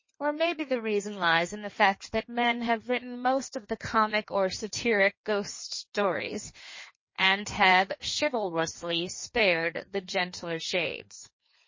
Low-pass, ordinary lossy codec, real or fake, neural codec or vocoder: 7.2 kHz; MP3, 32 kbps; fake; codec, 16 kHz in and 24 kHz out, 1.1 kbps, FireRedTTS-2 codec